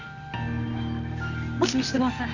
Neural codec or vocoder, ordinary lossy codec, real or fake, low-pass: codec, 24 kHz, 0.9 kbps, WavTokenizer, medium music audio release; none; fake; 7.2 kHz